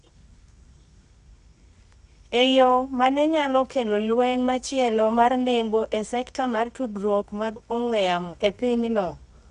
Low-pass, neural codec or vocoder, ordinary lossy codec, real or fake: 10.8 kHz; codec, 24 kHz, 0.9 kbps, WavTokenizer, medium music audio release; none; fake